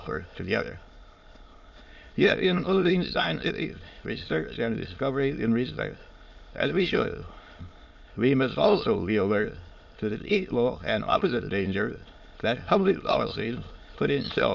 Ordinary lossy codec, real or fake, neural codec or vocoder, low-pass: MP3, 48 kbps; fake; autoencoder, 22.05 kHz, a latent of 192 numbers a frame, VITS, trained on many speakers; 7.2 kHz